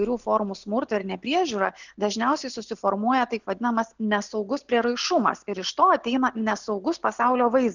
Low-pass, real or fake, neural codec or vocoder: 7.2 kHz; real; none